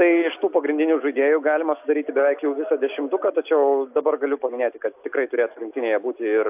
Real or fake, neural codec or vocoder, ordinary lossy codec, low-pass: real; none; AAC, 32 kbps; 3.6 kHz